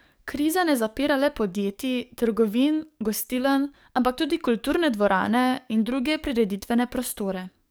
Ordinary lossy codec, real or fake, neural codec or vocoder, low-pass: none; fake; codec, 44.1 kHz, 7.8 kbps, DAC; none